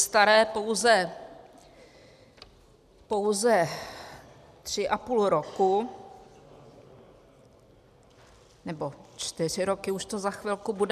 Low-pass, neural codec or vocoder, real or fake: 14.4 kHz; none; real